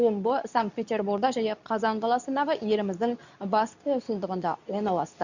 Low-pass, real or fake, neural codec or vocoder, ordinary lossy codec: 7.2 kHz; fake; codec, 24 kHz, 0.9 kbps, WavTokenizer, medium speech release version 2; none